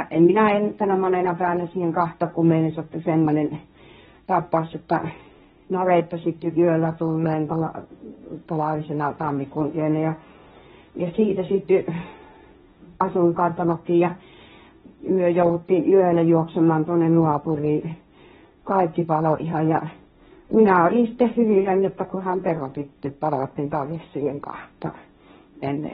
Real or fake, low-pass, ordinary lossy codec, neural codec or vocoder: fake; 7.2 kHz; AAC, 16 kbps; codec, 16 kHz, 1.1 kbps, Voila-Tokenizer